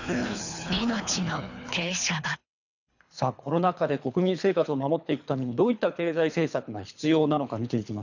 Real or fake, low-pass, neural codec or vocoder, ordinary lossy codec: fake; 7.2 kHz; codec, 24 kHz, 3 kbps, HILCodec; none